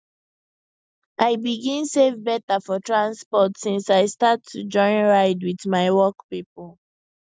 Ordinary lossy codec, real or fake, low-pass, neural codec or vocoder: none; real; none; none